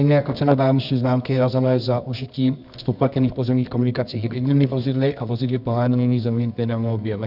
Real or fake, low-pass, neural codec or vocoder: fake; 5.4 kHz; codec, 24 kHz, 0.9 kbps, WavTokenizer, medium music audio release